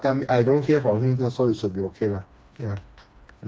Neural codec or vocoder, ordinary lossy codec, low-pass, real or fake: codec, 16 kHz, 2 kbps, FreqCodec, smaller model; none; none; fake